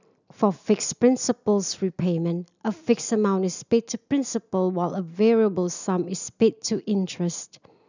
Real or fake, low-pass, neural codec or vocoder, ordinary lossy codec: real; 7.2 kHz; none; none